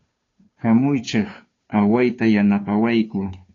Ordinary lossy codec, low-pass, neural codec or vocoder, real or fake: AAC, 32 kbps; 7.2 kHz; codec, 16 kHz, 2 kbps, FunCodec, trained on Chinese and English, 25 frames a second; fake